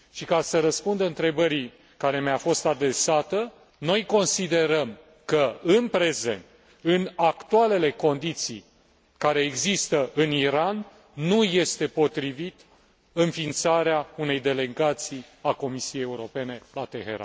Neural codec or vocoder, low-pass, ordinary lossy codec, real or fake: none; none; none; real